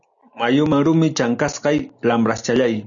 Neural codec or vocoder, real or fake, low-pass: none; real; 7.2 kHz